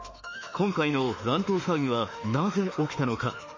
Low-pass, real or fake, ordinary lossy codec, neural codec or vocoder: 7.2 kHz; fake; MP3, 32 kbps; autoencoder, 48 kHz, 32 numbers a frame, DAC-VAE, trained on Japanese speech